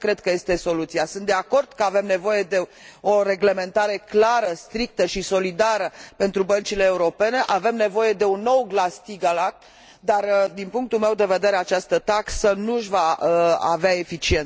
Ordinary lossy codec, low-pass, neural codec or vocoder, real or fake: none; none; none; real